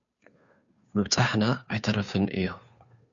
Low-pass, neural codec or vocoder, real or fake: 7.2 kHz; codec, 16 kHz, 2 kbps, FunCodec, trained on Chinese and English, 25 frames a second; fake